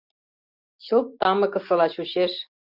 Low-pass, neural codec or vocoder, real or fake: 5.4 kHz; none; real